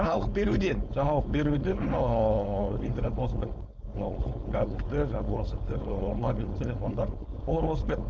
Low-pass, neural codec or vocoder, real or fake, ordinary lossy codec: none; codec, 16 kHz, 4.8 kbps, FACodec; fake; none